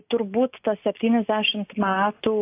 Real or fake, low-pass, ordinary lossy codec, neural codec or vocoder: real; 3.6 kHz; AAC, 24 kbps; none